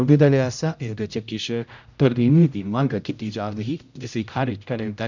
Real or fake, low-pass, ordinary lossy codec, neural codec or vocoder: fake; 7.2 kHz; none; codec, 16 kHz, 0.5 kbps, X-Codec, HuBERT features, trained on general audio